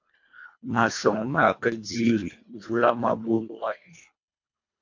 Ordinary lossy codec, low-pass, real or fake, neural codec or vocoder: MP3, 48 kbps; 7.2 kHz; fake; codec, 24 kHz, 1.5 kbps, HILCodec